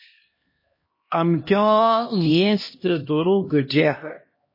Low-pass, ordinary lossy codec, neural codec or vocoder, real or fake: 5.4 kHz; MP3, 24 kbps; codec, 16 kHz, 1 kbps, X-Codec, HuBERT features, trained on LibriSpeech; fake